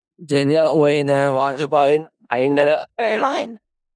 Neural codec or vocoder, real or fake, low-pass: codec, 16 kHz in and 24 kHz out, 0.4 kbps, LongCat-Audio-Codec, four codebook decoder; fake; 9.9 kHz